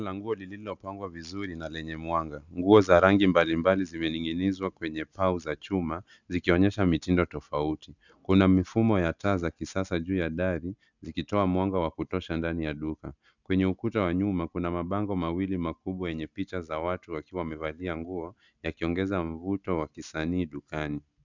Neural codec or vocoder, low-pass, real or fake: none; 7.2 kHz; real